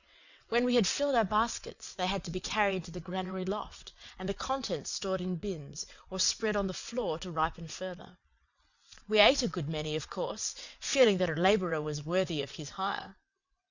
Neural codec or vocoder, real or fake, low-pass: vocoder, 22.05 kHz, 80 mel bands, WaveNeXt; fake; 7.2 kHz